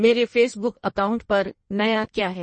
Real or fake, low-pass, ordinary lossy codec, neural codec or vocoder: fake; 9.9 kHz; MP3, 32 kbps; codec, 16 kHz in and 24 kHz out, 1.1 kbps, FireRedTTS-2 codec